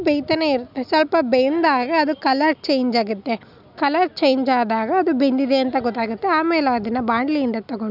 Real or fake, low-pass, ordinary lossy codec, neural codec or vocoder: real; 5.4 kHz; none; none